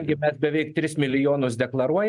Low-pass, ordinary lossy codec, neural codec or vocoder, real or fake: 10.8 kHz; MP3, 96 kbps; none; real